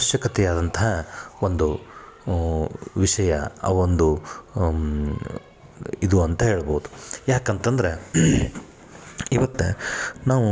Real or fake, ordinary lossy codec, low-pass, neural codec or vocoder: real; none; none; none